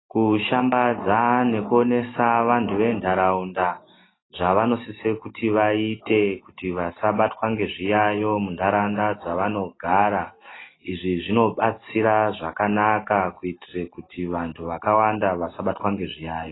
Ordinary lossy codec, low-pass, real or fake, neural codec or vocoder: AAC, 16 kbps; 7.2 kHz; real; none